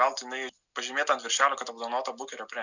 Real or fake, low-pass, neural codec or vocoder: real; 7.2 kHz; none